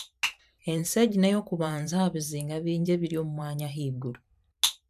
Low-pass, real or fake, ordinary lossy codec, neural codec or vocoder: 14.4 kHz; fake; none; vocoder, 44.1 kHz, 128 mel bands every 512 samples, BigVGAN v2